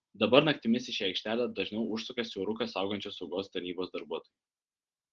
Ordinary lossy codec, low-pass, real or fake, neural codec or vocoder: Opus, 16 kbps; 7.2 kHz; real; none